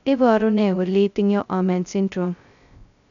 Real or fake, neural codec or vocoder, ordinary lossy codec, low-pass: fake; codec, 16 kHz, 0.2 kbps, FocalCodec; none; 7.2 kHz